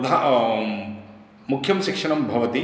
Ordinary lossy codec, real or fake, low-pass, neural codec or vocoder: none; real; none; none